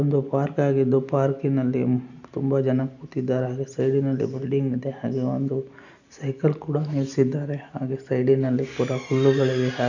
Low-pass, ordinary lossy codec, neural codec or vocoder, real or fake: 7.2 kHz; none; none; real